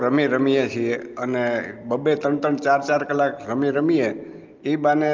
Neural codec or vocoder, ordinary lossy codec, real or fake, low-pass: none; Opus, 32 kbps; real; 7.2 kHz